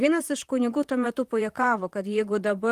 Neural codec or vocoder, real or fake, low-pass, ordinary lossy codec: vocoder, 44.1 kHz, 128 mel bands, Pupu-Vocoder; fake; 14.4 kHz; Opus, 24 kbps